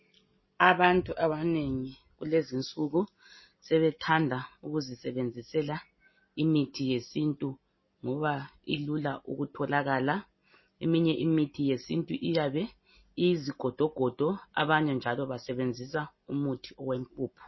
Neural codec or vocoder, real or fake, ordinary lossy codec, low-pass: none; real; MP3, 24 kbps; 7.2 kHz